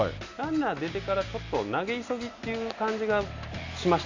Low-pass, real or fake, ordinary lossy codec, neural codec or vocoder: 7.2 kHz; real; AAC, 48 kbps; none